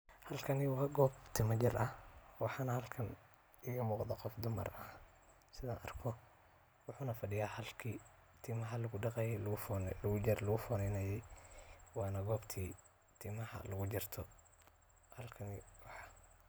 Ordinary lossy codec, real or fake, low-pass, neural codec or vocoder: none; real; none; none